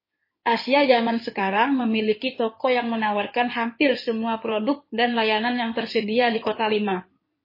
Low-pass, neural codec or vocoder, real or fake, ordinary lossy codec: 5.4 kHz; codec, 16 kHz in and 24 kHz out, 2.2 kbps, FireRedTTS-2 codec; fake; MP3, 24 kbps